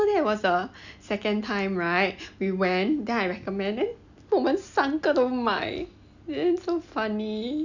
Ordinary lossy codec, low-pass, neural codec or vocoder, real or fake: none; 7.2 kHz; none; real